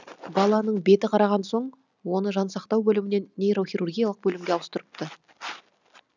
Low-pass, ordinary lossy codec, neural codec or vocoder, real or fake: 7.2 kHz; none; none; real